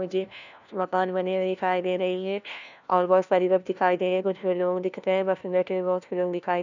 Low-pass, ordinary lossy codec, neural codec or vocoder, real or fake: 7.2 kHz; none; codec, 16 kHz, 1 kbps, FunCodec, trained on LibriTTS, 50 frames a second; fake